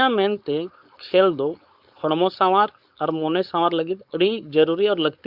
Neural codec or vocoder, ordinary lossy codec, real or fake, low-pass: codec, 16 kHz, 4.8 kbps, FACodec; Opus, 64 kbps; fake; 5.4 kHz